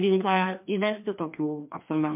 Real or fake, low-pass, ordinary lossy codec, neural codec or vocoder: fake; 3.6 kHz; MP3, 32 kbps; codec, 16 kHz, 2 kbps, FreqCodec, larger model